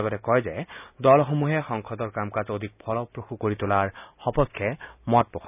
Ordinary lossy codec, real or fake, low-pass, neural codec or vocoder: none; real; 3.6 kHz; none